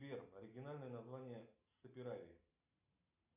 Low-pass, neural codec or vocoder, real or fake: 3.6 kHz; none; real